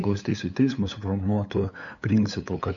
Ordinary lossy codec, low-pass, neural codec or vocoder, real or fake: MP3, 64 kbps; 7.2 kHz; codec, 16 kHz, 8 kbps, FunCodec, trained on LibriTTS, 25 frames a second; fake